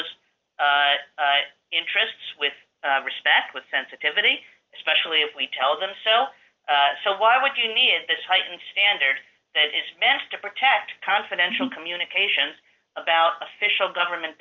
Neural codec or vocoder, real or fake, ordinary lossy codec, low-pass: none; real; Opus, 32 kbps; 7.2 kHz